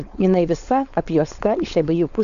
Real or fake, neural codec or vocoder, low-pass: fake; codec, 16 kHz, 4.8 kbps, FACodec; 7.2 kHz